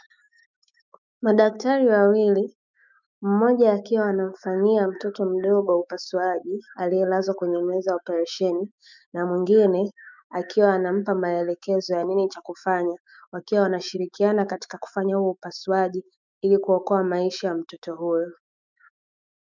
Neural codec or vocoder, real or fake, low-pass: autoencoder, 48 kHz, 128 numbers a frame, DAC-VAE, trained on Japanese speech; fake; 7.2 kHz